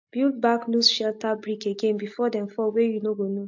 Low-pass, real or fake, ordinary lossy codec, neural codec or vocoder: 7.2 kHz; real; MP3, 48 kbps; none